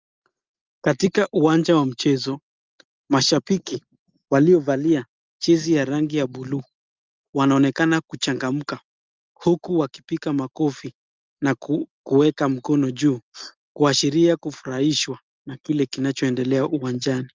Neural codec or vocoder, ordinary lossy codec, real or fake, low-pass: none; Opus, 24 kbps; real; 7.2 kHz